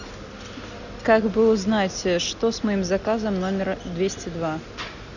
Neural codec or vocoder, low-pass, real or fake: none; 7.2 kHz; real